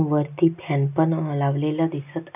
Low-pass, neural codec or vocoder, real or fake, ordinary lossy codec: 3.6 kHz; none; real; none